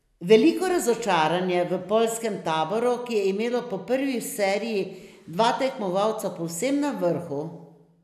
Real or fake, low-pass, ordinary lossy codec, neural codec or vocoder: real; 14.4 kHz; none; none